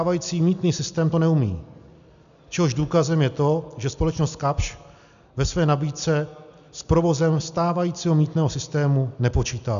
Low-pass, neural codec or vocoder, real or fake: 7.2 kHz; none; real